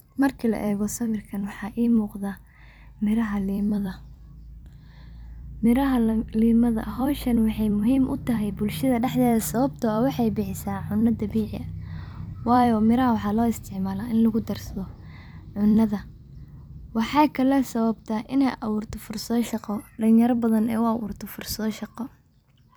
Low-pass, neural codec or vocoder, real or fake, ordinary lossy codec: none; vocoder, 44.1 kHz, 128 mel bands every 256 samples, BigVGAN v2; fake; none